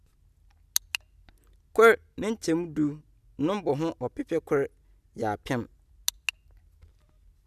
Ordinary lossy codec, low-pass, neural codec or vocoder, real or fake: none; 14.4 kHz; none; real